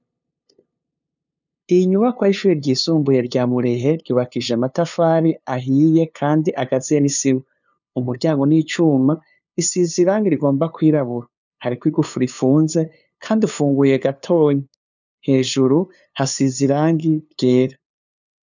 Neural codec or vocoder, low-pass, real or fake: codec, 16 kHz, 2 kbps, FunCodec, trained on LibriTTS, 25 frames a second; 7.2 kHz; fake